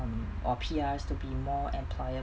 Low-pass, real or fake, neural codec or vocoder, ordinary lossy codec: none; real; none; none